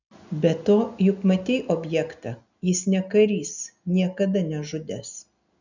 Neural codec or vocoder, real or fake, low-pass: none; real; 7.2 kHz